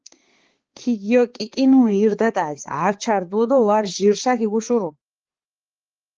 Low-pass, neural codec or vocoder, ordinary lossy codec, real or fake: 7.2 kHz; codec, 16 kHz, 4 kbps, X-Codec, HuBERT features, trained on balanced general audio; Opus, 16 kbps; fake